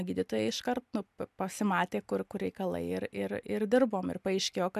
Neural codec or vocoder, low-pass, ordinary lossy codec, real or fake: none; 14.4 kHz; MP3, 96 kbps; real